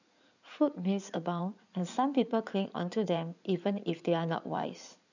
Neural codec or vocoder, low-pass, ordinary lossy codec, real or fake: codec, 16 kHz in and 24 kHz out, 2.2 kbps, FireRedTTS-2 codec; 7.2 kHz; none; fake